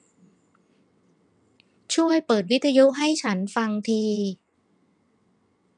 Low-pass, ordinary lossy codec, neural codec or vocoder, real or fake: 9.9 kHz; none; vocoder, 22.05 kHz, 80 mel bands, WaveNeXt; fake